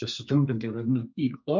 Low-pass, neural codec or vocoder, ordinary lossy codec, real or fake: 7.2 kHz; codec, 32 kHz, 1.9 kbps, SNAC; MP3, 48 kbps; fake